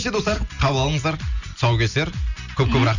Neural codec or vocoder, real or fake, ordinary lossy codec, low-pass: none; real; none; 7.2 kHz